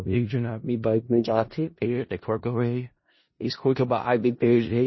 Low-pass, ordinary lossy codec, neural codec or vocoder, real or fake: 7.2 kHz; MP3, 24 kbps; codec, 16 kHz in and 24 kHz out, 0.4 kbps, LongCat-Audio-Codec, four codebook decoder; fake